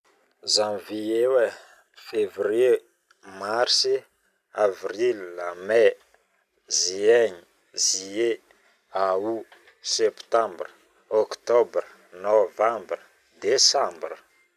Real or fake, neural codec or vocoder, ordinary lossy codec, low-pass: real; none; none; 14.4 kHz